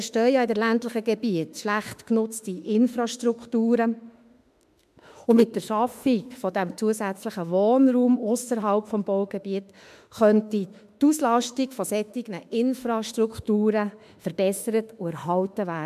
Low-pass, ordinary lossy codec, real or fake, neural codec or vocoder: 14.4 kHz; MP3, 96 kbps; fake; autoencoder, 48 kHz, 32 numbers a frame, DAC-VAE, trained on Japanese speech